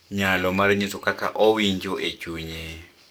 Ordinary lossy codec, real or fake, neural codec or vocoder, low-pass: none; fake; codec, 44.1 kHz, 7.8 kbps, DAC; none